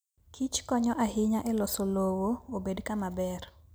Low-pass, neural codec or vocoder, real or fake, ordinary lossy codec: none; none; real; none